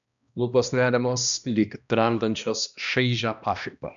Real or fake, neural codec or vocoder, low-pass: fake; codec, 16 kHz, 1 kbps, X-Codec, HuBERT features, trained on balanced general audio; 7.2 kHz